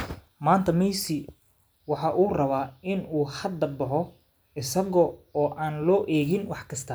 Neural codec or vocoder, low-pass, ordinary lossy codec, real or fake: none; none; none; real